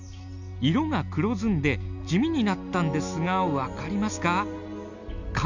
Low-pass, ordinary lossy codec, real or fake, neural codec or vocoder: 7.2 kHz; none; real; none